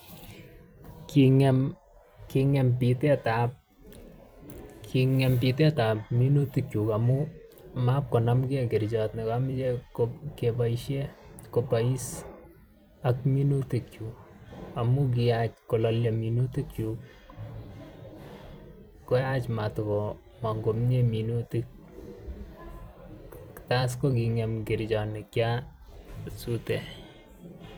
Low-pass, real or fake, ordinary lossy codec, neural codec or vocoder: none; fake; none; vocoder, 44.1 kHz, 128 mel bands every 256 samples, BigVGAN v2